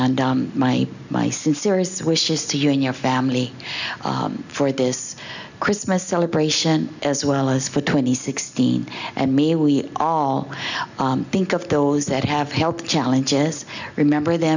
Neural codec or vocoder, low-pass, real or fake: none; 7.2 kHz; real